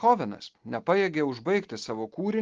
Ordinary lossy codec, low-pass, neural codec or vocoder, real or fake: Opus, 16 kbps; 7.2 kHz; none; real